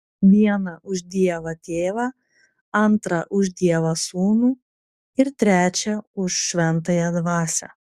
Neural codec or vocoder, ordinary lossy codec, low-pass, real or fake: codec, 44.1 kHz, 7.8 kbps, DAC; Opus, 64 kbps; 14.4 kHz; fake